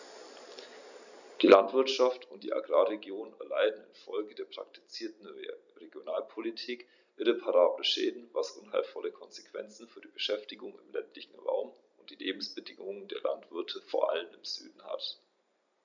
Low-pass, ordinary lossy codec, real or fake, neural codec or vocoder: 7.2 kHz; none; real; none